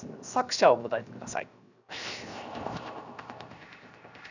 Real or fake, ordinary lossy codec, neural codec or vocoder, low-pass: fake; none; codec, 16 kHz, 0.7 kbps, FocalCodec; 7.2 kHz